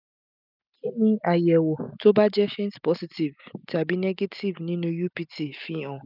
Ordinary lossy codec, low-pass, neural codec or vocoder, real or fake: none; 5.4 kHz; none; real